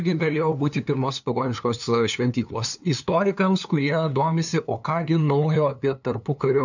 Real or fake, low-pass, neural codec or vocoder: fake; 7.2 kHz; codec, 16 kHz, 2 kbps, FunCodec, trained on LibriTTS, 25 frames a second